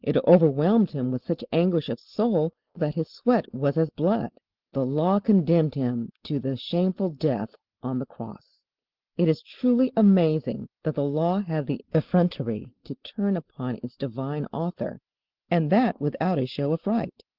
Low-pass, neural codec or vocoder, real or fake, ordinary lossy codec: 5.4 kHz; none; real; Opus, 16 kbps